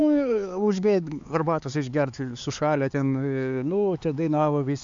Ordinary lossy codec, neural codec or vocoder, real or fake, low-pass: AAC, 64 kbps; codec, 16 kHz, 4 kbps, X-Codec, HuBERT features, trained on LibriSpeech; fake; 7.2 kHz